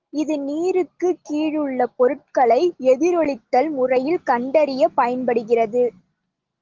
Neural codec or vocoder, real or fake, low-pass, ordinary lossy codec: none; real; 7.2 kHz; Opus, 16 kbps